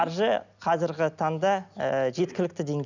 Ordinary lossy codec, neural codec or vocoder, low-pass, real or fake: none; none; 7.2 kHz; real